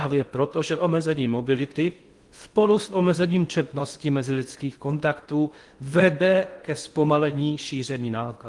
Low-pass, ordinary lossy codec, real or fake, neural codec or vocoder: 10.8 kHz; Opus, 24 kbps; fake; codec, 16 kHz in and 24 kHz out, 0.6 kbps, FocalCodec, streaming, 2048 codes